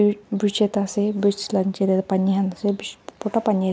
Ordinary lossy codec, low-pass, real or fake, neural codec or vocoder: none; none; real; none